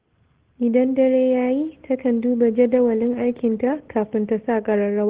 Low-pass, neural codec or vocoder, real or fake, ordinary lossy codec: 3.6 kHz; none; real; Opus, 16 kbps